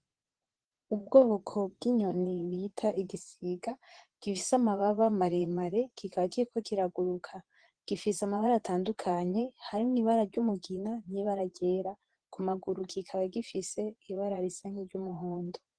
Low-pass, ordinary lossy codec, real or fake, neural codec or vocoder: 9.9 kHz; Opus, 16 kbps; fake; vocoder, 22.05 kHz, 80 mel bands, Vocos